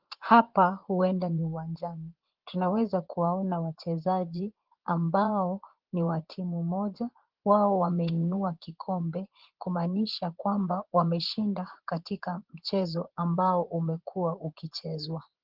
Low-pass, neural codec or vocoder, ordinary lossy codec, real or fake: 5.4 kHz; vocoder, 24 kHz, 100 mel bands, Vocos; Opus, 16 kbps; fake